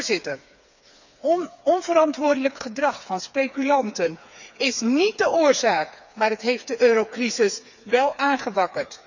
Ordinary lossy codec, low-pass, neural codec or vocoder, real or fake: none; 7.2 kHz; codec, 16 kHz, 4 kbps, FreqCodec, smaller model; fake